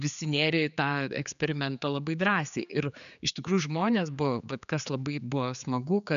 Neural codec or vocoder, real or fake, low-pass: codec, 16 kHz, 4 kbps, X-Codec, HuBERT features, trained on general audio; fake; 7.2 kHz